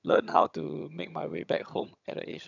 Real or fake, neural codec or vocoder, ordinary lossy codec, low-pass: fake; vocoder, 22.05 kHz, 80 mel bands, HiFi-GAN; none; 7.2 kHz